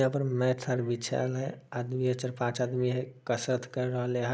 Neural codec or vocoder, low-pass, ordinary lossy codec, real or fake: none; none; none; real